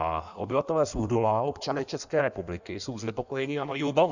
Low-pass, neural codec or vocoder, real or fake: 7.2 kHz; codec, 16 kHz in and 24 kHz out, 1.1 kbps, FireRedTTS-2 codec; fake